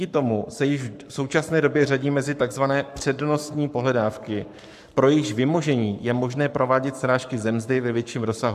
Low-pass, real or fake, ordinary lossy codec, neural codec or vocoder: 14.4 kHz; fake; AAC, 96 kbps; codec, 44.1 kHz, 7.8 kbps, Pupu-Codec